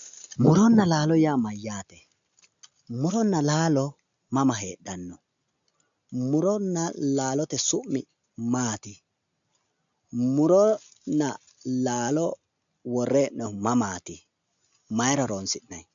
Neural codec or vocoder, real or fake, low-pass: none; real; 7.2 kHz